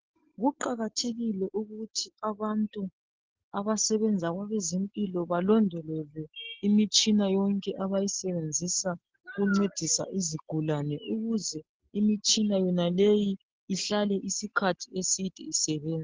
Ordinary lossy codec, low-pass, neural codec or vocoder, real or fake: Opus, 16 kbps; 7.2 kHz; none; real